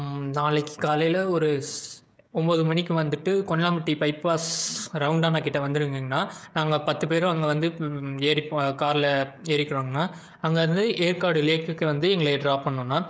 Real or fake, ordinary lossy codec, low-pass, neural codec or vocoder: fake; none; none; codec, 16 kHz, 16 kbps, FreqCodec, smaller model